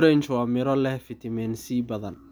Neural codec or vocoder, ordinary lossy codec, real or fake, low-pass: none; none; real; none